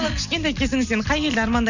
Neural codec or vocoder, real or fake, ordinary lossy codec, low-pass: none; real; none; 7.2 kHz